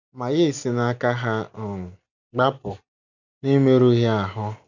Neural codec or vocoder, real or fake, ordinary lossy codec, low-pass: none; real; none; 7.2 kHz